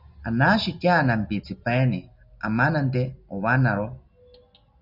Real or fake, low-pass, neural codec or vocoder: real; 5.4 kHz; none